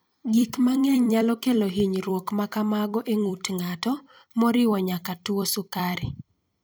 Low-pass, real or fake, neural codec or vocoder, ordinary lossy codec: none; fake; vocoder, 44.1 kHz, 128 mel bands every 256 samples, BigVGAN v2; none